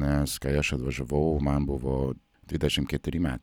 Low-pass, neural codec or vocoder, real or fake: 19.8 kHz; none; real